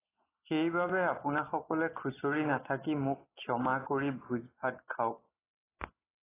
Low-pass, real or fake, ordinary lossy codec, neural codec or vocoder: 3.6 kHz; real; AAC, 16 kbps; none